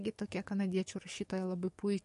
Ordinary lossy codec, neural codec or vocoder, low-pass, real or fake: MP3, 48 kbps; codec, 44.1 kHz, 7.8 kbps, DAC; 14.4 kHz; fake